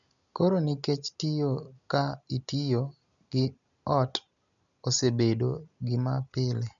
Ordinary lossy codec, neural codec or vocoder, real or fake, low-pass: none; none; real; 7.2 kHz